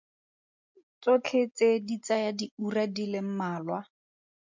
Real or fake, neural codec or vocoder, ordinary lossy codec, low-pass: real; none; MP3, 64 kbps; 7.2 kHz